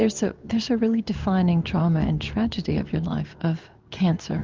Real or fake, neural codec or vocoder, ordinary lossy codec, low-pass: fake; codec, 16 kHz in and 24 kHz out, 1 kbps, XY-Tokenizer; Opus, 32 kbps; 7.2 kHz